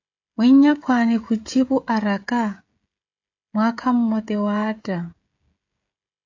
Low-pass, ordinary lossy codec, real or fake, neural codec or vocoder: 7.2 kHz; AAC, 48 kbps; fake; codec, 16 kHz, 16 kbps, FreqCodec, smaller model